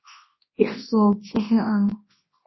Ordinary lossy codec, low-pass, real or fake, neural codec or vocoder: MP3, 24 kbps; 7.2 kHz; fake; codec, 24 kHz, 0.9 kbps, WavTokenizer, large speech release